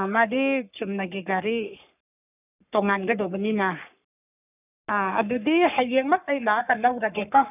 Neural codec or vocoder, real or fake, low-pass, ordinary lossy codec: codec, 44.1 kHz, 3.4 kbps, Pupu-Codec; fake; 3.6 kHz; none